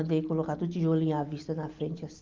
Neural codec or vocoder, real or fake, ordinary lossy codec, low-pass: none; real; Opus, 24 kbps; 7.2 kHz